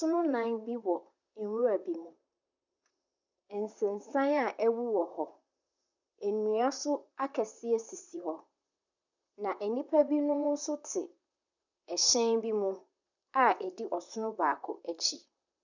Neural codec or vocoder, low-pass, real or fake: vocoder, 44.1 kHz, 128 mel bands, Pupu-Vocoder; 7.2 kHz; fake